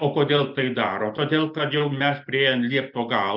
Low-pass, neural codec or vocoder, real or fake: 5.4 kHz; none; real